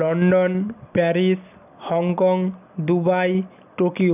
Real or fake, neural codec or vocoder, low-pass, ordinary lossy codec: real; none; 3.6 kHz; none